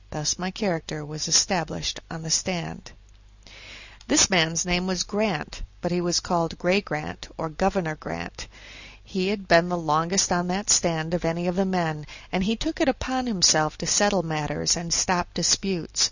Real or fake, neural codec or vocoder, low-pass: real; none; 7.2 kHz